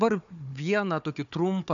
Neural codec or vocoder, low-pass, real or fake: codec, 16 kHz, 4 kbps, FunCodec, trained on Chinese and English, 50 frames a second; 7.2 kHz; fake